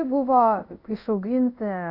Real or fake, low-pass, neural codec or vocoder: fake; 5.4 kHz; codec, 24 kHz, 0.5 kbps, DualCodec